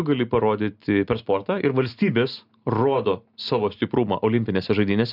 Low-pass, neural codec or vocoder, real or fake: 5.4 kHz; none; real